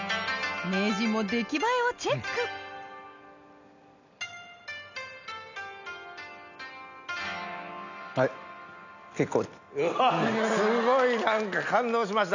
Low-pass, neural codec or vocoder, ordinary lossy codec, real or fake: 7.2 kHz; none; none; real